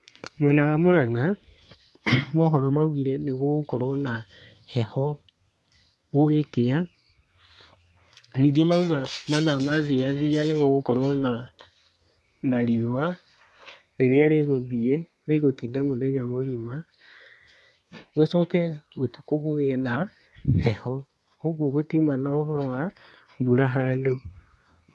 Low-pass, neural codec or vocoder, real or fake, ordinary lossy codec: none; codec, 24 kHz, 1 kbps, SNAC; fake; none